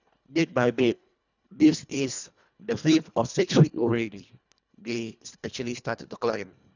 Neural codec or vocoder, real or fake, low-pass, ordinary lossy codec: codec, 24 kHz, 1.5 kbps, HILCodec; fake; 7.2 kHz; none